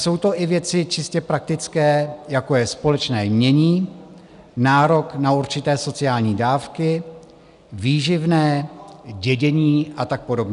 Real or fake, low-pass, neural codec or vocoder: real; 10.8 kHz; none